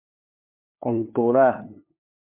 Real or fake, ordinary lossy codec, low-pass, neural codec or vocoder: fake; MP3, 32 kbps; 3.6 kHz; codec, 16 kHz, 2 kbps, FunCodec, trained on LibriTTS, 25 frames a second